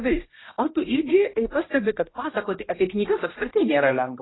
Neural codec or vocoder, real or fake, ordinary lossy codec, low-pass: codec, 16 kHz, 1 kbps, X-Codec, HuBERT features, trained on general audio; fake; AAC, 16 kbps; 7.2 kHz